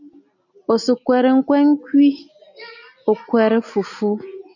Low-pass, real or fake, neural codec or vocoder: 7.2 kHz; real; none